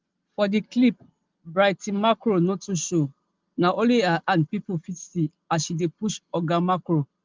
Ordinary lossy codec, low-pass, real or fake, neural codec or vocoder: Opus, 24 kbps; 7.2 kHz; real; none